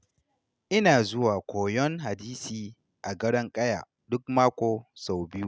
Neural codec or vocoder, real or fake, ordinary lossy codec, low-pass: none; real; none; none